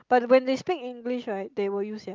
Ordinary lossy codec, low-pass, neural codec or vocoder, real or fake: Opus, 32 kbps; 7.2 kHz; none; real